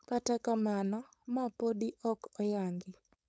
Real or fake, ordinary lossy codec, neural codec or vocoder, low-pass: fake; none; codec, 16 kHz, 4.8 kbps, FACodec; none